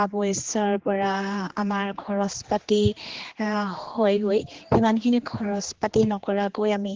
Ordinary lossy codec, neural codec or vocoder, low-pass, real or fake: Opus, 16 kbps; codec, 16 kHz, 2 kbps, X-Codec, HuBERT features, trained on general audio; 7.2 kHz; fake